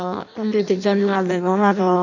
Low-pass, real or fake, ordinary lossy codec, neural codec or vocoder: 7.2 kHz; fake; none; codec, 16 kHz in and 24 kHz out, 0.6 kbps, FireRedTTS-2 codec